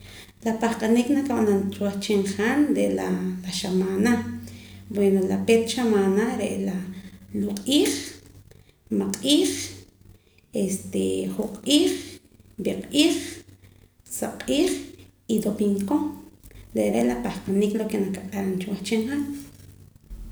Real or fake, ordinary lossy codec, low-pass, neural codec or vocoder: real; none; none; none